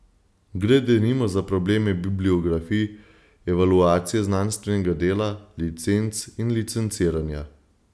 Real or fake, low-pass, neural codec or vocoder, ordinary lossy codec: real; none; none; none